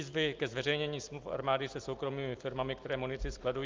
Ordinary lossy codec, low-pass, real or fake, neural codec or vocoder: Opus, 24 kbps; 7.2 kHz; real; none